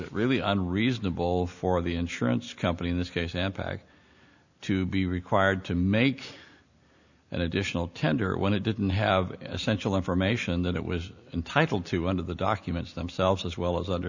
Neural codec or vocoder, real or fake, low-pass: none; real; 7.2 kHz